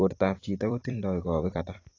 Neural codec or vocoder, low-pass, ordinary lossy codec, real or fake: vocoder, 44.1 kHz, 128 mel bands every 256 samples, BigVGAN v2; 7.2 kHz; AAC, 32 kbps; fake